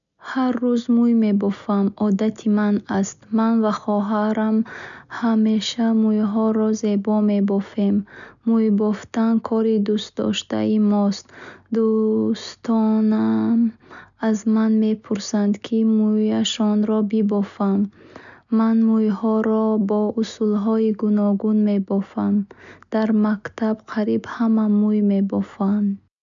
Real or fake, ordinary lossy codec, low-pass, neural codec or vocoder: real; none; 7.2 kHz; none